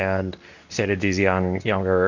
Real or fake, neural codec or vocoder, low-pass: fake; codec, 24 kHz, 0.9 kbps, WavTokenizer, medium speech release version 2; 7.2 kHz